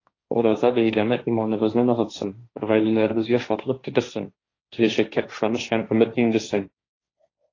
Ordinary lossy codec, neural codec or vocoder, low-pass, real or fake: AAC, 32 kbps; codec, 16 kHz, 1.1 kbps, Voila-Tokenizer; 7.2 kHz; fake